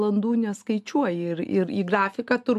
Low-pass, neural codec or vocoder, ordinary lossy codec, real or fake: 14.4 kHz; none; AAC, 96 kbps; real